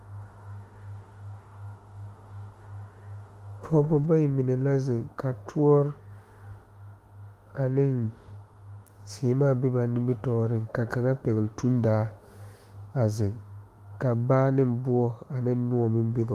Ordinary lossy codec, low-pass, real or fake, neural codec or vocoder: Opus, 24 kbps; 14.4 kHz; fake; autoencoder, 48 kHz, 32 numbers a frame, DAC-VAE, trained on Japanese speech